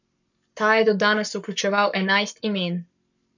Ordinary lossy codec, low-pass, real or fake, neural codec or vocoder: none; 7.2 kHz; fake; codec, 44.1 kHz, 7.8 kbps, Pupu-Codec